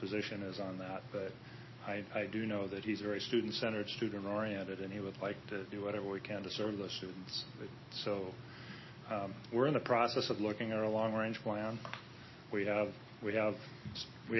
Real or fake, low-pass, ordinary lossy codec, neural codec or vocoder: real; 7.2 kHz; MP3, 24 kbps; none